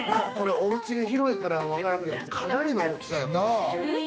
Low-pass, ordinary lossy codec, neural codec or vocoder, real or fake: none; none; codec, 16 kHz, 2 kbps, X-Codec, HuBERT features, trained on general audio; fake